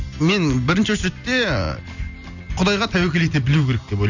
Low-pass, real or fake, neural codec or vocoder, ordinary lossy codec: 7.2 kHz; real; none; none